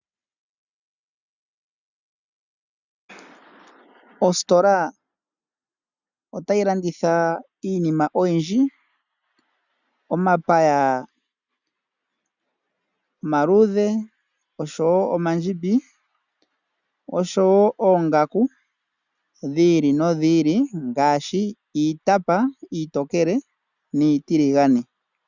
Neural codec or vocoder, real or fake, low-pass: none; real; 7.2 kHz